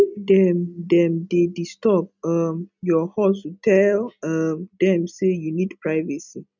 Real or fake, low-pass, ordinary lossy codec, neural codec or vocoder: real; 7.2 kHz; none; none